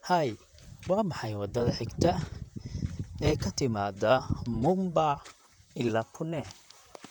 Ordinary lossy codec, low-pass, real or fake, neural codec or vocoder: none; 19.8 kHz; fake; vocoder, 44.1 kHz, 128 mel bands, Pupu-Vocoder